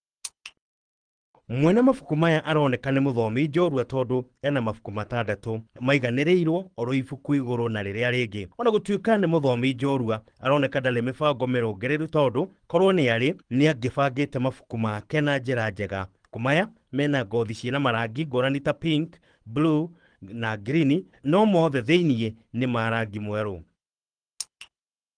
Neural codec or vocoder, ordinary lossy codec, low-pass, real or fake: codec, 24 kHz, 6 kbps, HILCodec; Opus, 24 kbps; 9.9 kHz; fake